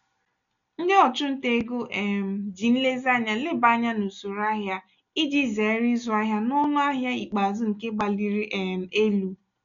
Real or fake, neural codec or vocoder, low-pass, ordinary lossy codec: real; none; 7.2 kHz; none